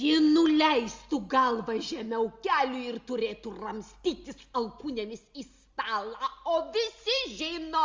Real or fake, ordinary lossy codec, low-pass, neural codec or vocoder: real; Opus, 32 kbps; 7.2 kHz; none